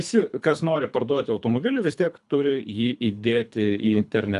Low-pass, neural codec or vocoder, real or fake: 10.8 kHz; codec, 24 kHz, 3 kbps, HILCodec; fake